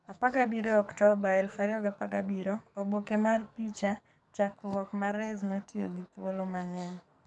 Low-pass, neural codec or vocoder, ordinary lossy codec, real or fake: 10.8 kHz; codec, 44.1 kHz, 2.6 kbps, SNAC; none; fake